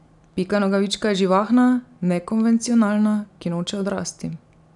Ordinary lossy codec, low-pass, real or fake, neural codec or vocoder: none; 10.8 kHz; real; none